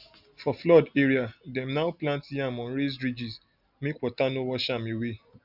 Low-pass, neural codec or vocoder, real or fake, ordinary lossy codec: 5.4 kHz; none; real; none